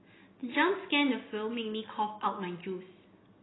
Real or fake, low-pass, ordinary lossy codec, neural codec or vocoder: real; 7.2 kHz; AAC, 16 kbps; none